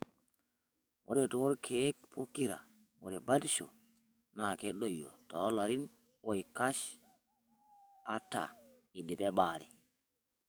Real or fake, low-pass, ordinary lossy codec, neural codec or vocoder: fake; none; none; codec, 44.1 kHz, 7.8 kbps, DAC